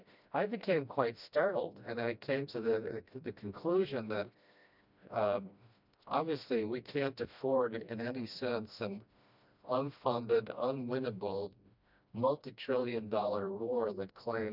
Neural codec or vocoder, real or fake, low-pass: codec, 16 kHz, 1 kbps, FreqCodec, smaller model; fake; 5.4 kHz